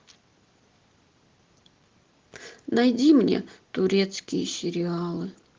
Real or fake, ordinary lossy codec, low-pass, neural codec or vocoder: real; Opus, 16 kbps; 7.2 kHz; none